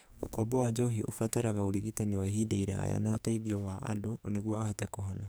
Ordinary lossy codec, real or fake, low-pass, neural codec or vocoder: none; fake; none; codec, 44.1 kHz, 2.6 kbps, SNAC